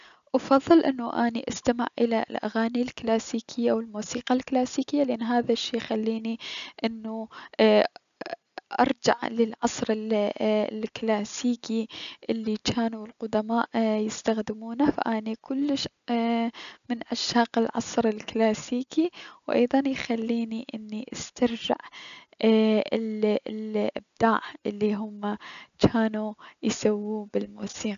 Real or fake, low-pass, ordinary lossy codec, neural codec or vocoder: real; 7.2 kHz; none; none